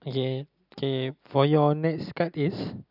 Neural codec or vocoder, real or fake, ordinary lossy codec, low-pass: none; real; none; 5.4 kHz